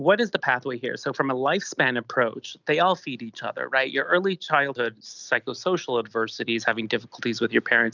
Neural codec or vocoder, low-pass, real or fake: none; 7.2 kHz; real